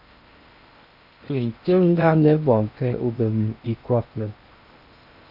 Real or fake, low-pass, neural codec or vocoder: fake; 5.4 kHz; codec, 16 kHz in and 24 kHz out, 0.6 kbps, FocalCodec, streaming, 4096 codes